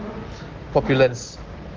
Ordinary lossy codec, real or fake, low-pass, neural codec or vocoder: Opus, 16 kbps; real; 7.2 kHz; none